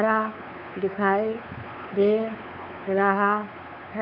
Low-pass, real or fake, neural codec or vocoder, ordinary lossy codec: 5.4 kHz; fake; codec, 16 kHz, 4 kbps, X-Codec, WavLM features, trained on Multilingual LibriSpeech; none